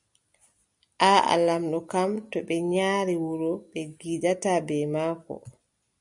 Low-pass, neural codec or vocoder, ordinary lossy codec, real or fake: 10.8 kHz; none; MP3, 96 kbps; real